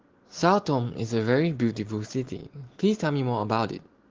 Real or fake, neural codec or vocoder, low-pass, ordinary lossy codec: real; none; 7.2 kHz; Opus, 16 kbps